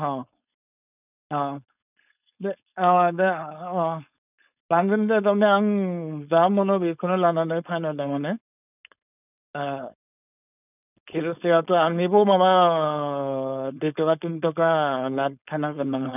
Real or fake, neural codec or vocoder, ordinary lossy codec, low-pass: fake; codec, 16 kHz, 4.8 kbps, FACodec; none; 3.6 kHz